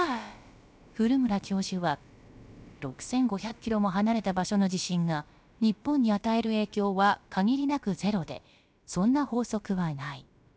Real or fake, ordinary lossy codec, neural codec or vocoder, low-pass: fake; none; codec, 16 kHz, about 1 kbps, DyCAST, with the encoder's durations; none